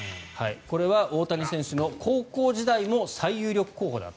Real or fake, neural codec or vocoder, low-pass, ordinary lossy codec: real; none; none; none